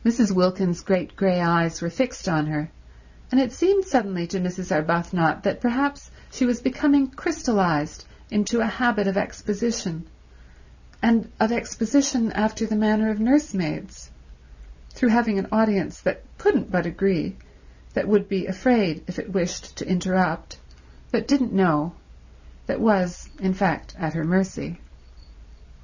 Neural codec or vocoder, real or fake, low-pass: none; real; 7.2 kHz